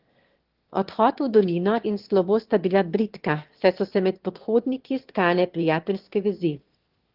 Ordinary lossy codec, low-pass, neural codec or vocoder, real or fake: Opus, 16 kbps; 5.4 kHz; autoencoder, 22.05 kHz, a latent of 192 numbers a frame, VITS, trained on one speaker; fake